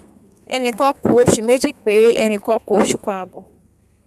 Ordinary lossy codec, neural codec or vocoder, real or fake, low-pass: none; codec, 32 kHz, 1.9 kbps, SNAC; fake; 14.4 kHz